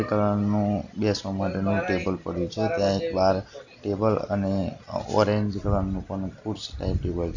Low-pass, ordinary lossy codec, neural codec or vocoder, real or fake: 7.2 kHz; none; none; real